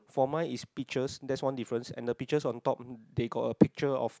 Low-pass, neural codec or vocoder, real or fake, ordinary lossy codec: none; none; real; none